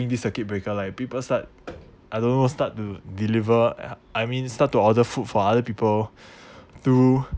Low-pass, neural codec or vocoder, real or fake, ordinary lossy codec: none; none; real; none